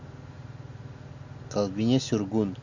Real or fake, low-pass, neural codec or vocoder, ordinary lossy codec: real; 7.2 kHz; none; Opus, 64 kbps